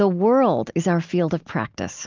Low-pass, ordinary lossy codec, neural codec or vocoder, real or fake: 7.2 kHz; Opus, 32 kbps; none; real